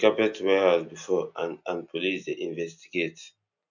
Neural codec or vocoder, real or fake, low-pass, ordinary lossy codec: none; real; 7.2 kHz; none